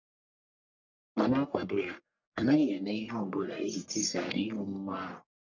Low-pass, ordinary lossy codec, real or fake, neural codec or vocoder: 7.2 kHz; none; fake; codec, 44.1 kHz, 1.7 kbps, Pupu-Codec